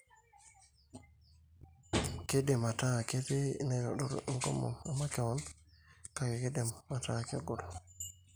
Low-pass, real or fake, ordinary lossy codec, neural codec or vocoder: none; real; none; none